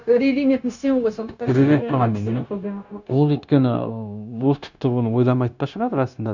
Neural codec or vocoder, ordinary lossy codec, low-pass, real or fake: codec, 16 kHz, 0.9 kbps, LongCat-Audio-Codec; none; 7.2 kHz; fake